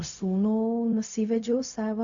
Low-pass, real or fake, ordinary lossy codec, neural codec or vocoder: 7.2 kHz; fake; AAC, 64 kbps; codec, 16 kHz, 0.4 kbps, LongCat-Audio-Codec